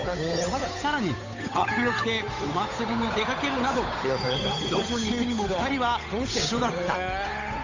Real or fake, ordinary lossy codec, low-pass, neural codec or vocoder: fake; AAC, 48 kbps; 7.2 kHz; codec, 16 kHz, 8 kbps, FunCodec, trained on Chinese and English, 25 frames a second